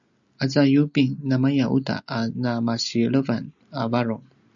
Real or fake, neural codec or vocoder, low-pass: real; none; 7.2 kHz